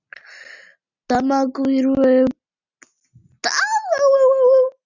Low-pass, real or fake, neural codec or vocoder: 7.2 kHz; real; none